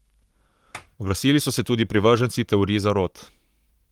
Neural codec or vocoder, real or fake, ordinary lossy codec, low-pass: codec, 44.1 kHz, 7.8 kbps, Pupu-Codec; fake; Opus, 32 kbps; 19.8 kHz